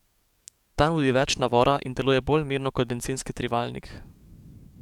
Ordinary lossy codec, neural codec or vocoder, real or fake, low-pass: none; codec, 44.1 kHz, 7.8 kbps, DAC; fake; 19.8 kHz